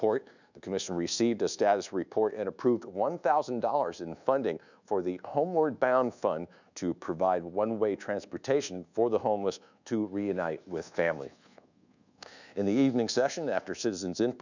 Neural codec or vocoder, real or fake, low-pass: codec, 24 kHz, 1.2 kbps, DualCodec; fake; 7.2 kHz